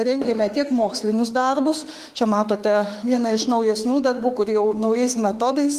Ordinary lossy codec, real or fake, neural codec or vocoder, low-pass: Opus, 24 kbps; fake; autoencoder, 48 kHz, 32 numbers a frame, DAC-VAE, trained on Japanese speech; 14.4 kHz